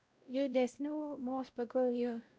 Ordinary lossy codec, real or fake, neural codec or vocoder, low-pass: none; fake; codec, 16 kHz, 0.5 kbps, X-Codec, WavLM features, trained on Multilingual LibriSpeech; none